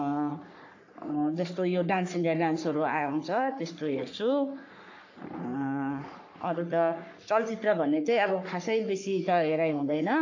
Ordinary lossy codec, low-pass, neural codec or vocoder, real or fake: AAC, 48 kbps; 7.2 kHz; codec, 44.1 kHz, 3.4 kbps, Pupu-Codec; fake